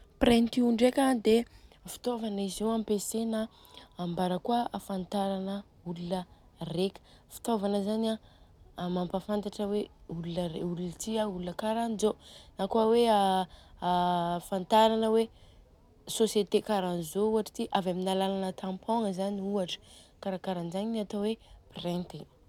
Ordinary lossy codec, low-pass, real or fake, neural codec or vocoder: none; 19.8 kHz; real; none